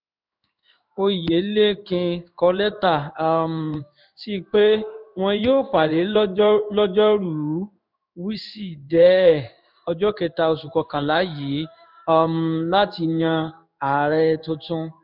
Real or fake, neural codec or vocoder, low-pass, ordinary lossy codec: fake; codec, 16 kHz in and 24 kHz out, 1 kbps, XY-Tokenizer; 5.4 kHz; none